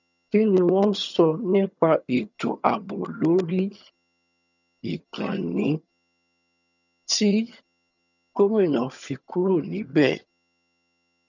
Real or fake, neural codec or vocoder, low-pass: fake; vocoder, 22.05 kHz, 80 mel bands, HiFi-GAN; 7.2 kHz